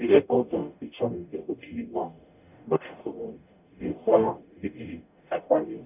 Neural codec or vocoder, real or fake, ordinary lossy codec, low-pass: codec, 44.1 kHz, 0.9 kbps, DAC; fake; none; 3.6 kHz